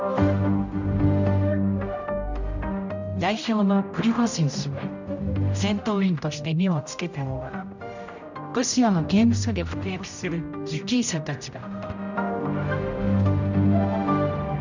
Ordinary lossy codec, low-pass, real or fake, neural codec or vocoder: none; 7.2 kHz; fake; codec, 16 kHz, 0.5 kbps, X-Codec, HuBERT features, trained on general audio